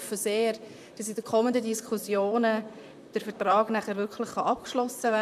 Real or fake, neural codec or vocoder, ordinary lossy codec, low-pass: fake; vocoder, 44.1 kHz, 128 mel bands, Pupu-Vocoder; none; 14.4 kHz